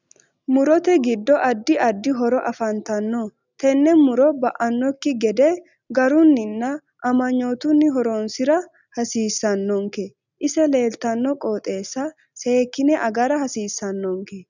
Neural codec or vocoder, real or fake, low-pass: none; real; 7.2 kHz